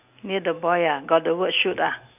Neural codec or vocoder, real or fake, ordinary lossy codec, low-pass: none; real; none; 3.6 kHz